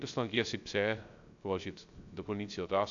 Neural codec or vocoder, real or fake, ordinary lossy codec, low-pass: codec, 16 kHz, 0.3 kbps, FocalCodec; fake; AAC, 64 kbps; 7.2 kHz